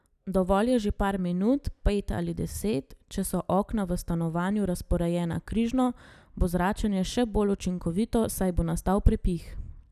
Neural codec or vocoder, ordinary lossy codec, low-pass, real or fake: none; none; 14.4 kHz; real